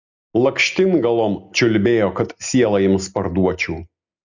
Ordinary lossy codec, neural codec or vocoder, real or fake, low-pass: Opus, 64 kbps; none; real; 7.2 kHz